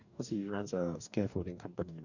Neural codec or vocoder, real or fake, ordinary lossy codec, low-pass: codec, 44.1 kHz, 2.6 kbps, DAC; fake; none; 7.2 kHz